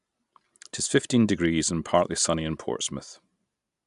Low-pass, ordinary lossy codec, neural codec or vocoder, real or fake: 10.8 kHz; AAC, 96 kbps; none; real